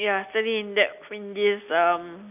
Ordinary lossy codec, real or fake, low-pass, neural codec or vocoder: none; real; 3.6 kHz; none